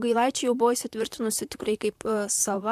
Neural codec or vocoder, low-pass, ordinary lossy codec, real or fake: vocoder, 44.1 kHz, 128 mel bands, Pupu-Vocoder; 14.4 kHz; MP3, 96 kbps; fake